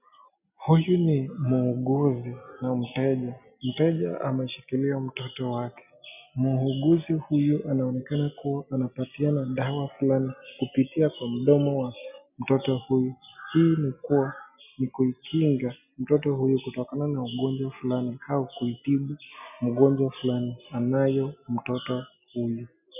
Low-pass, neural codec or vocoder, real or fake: 3.6 kHz; none; real